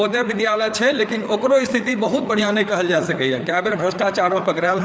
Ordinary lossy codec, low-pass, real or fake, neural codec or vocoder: none; none; fake; codec, 16 kHz, 4 kbps, FreqCodec, larger model